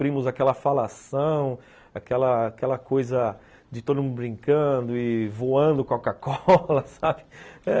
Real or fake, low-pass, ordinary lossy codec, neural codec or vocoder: real; none; none; none